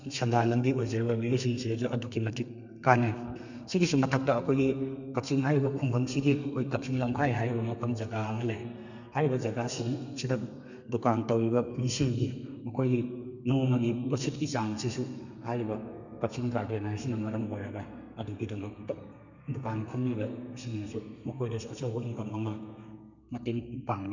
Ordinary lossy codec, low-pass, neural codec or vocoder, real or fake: none; 7.2 kHz; codec, 32 kHz, 1.9 kbps, SNAC; fake